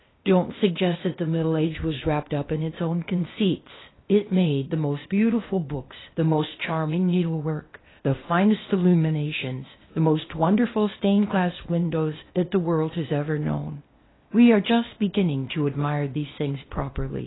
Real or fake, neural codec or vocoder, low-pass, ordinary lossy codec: fake; codec, 16 kHz, 0.8 kbps, ZipCodec; 7.2 kHz; AAC, 16 kbps